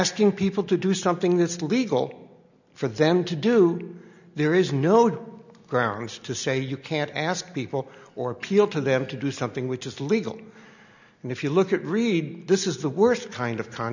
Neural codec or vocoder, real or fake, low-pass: none; real; 7.2 kHz